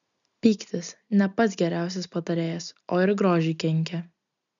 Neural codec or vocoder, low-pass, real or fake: none; 7.2 kHz; real